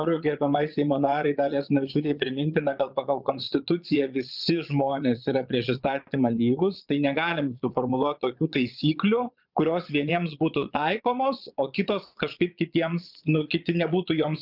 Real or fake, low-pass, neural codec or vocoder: fake; 5.4 kHz; vocoder, 22.05 kHz, 80 mel bands, Vocos